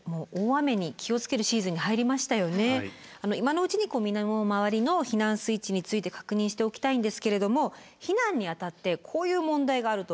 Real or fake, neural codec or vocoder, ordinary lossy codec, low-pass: real; none; none; none